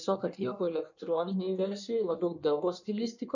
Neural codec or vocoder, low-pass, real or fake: codec, 16 kHz in and 24 kHz out, 1.1 kbps, FireRedTTS-2 codec; 7.2 kHz; fake